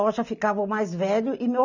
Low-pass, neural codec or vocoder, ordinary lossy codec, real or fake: 7.2 kHz; none; none; real